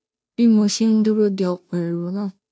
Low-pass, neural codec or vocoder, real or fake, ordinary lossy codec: none; codec, 16 kHz, 0.5 kbps, FunCodec, trained on Chinese and English, 25 frames a second; fake; none